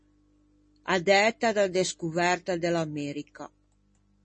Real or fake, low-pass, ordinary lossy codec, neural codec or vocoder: real; 10.8 kHz; MP3, 32 kbps; none